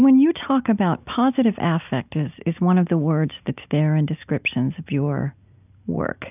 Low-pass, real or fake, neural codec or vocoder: 3.6 kHz; real; none